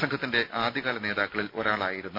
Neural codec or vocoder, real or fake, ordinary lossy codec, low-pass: none; real; none; 5.4 kHz